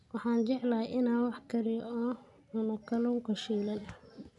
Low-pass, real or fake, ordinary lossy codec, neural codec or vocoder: 10.8 kHz; real; none; none